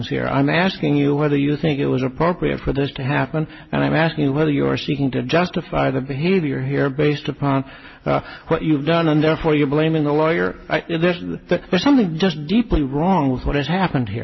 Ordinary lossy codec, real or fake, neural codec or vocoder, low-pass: MP3, 24 kbps; real; none; 7.2 kHz